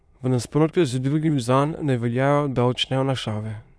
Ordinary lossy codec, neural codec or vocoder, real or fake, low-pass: none; autoencoder, 22.05 kHz, a latent of 192 numbers a frame, VITS, trained on many speakers; fake; none